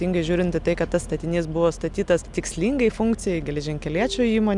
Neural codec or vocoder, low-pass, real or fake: none; 10.8 kHz; real